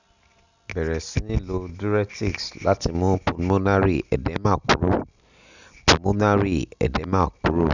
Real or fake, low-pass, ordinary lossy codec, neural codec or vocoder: real; 7.2 kHz; none; none